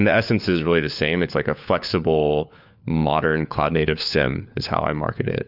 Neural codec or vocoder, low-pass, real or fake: codec, 16 kHz, 4 kbps, FunCodec, trained on LibriTTS, 50 frames a second; 5.4 kHz; fake